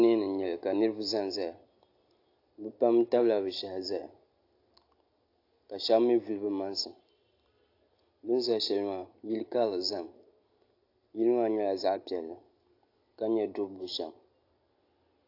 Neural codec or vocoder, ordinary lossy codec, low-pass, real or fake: none; AAC, 32 kbps; 5.4 kHz; real